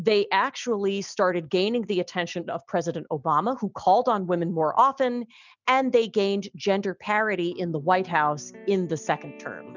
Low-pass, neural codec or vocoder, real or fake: 7.2 kHz; none; real